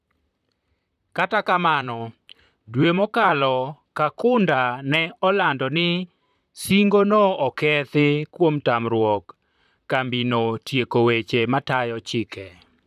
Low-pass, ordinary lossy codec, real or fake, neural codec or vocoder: 14.4 kHz; none; fake; vocoder, 44.1 kHz, 128 mel bands, Pupu-Vocoder